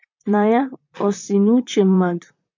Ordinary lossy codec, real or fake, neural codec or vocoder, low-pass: MP3, 48 kbps; fake; vocoder, 44.1 kHz, 128 mel bands, Pupu-Vocoder; 7.2 kHz